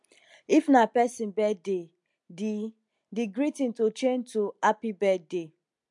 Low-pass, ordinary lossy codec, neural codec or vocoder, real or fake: 10.8 kHz; MP3, 64 kbps; none; real